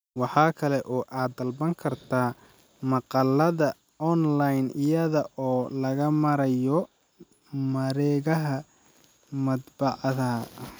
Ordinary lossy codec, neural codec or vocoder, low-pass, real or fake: none; none; none; real